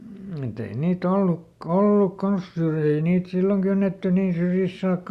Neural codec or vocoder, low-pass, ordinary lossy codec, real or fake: none; 14.4 kHz; Opus, 64 kbps; real